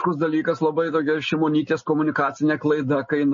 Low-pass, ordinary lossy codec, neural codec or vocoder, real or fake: 7.2 kHz; MP3, 32 kbps; none; real